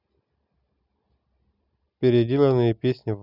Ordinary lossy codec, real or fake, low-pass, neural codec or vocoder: none; real; 5.4 kHz; none